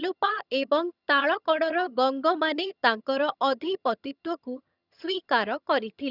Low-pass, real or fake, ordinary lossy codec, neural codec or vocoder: 5.4 kHz; fake; none; vocoder, 22.05 kHz, 80 mel bands, HiFi-GAN